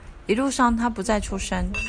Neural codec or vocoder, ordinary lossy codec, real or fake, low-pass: none; Opus, 64 kbps; real; 9.9 kHz